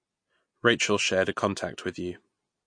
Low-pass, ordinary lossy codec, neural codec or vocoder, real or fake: 9.9 kHz; MP3, 48 kbps; none; real